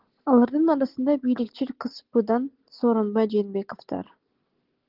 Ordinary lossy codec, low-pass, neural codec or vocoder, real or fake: Opus, 16 kbps; 5.4 kHz; none; real